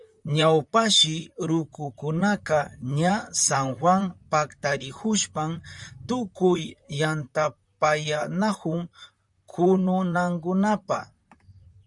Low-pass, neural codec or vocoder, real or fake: 10.8 kHz; vocoder, 44.1 kHz, 128 mel bands, Pupu-Vocoder; fake